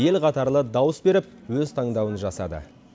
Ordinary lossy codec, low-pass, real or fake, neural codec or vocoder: none; none; real; none